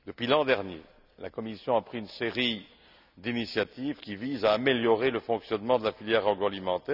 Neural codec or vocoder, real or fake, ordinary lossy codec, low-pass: none; real; none; 5.4 kHz